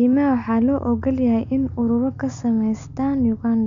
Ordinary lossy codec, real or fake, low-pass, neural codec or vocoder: none; real; 7.2 kHz; none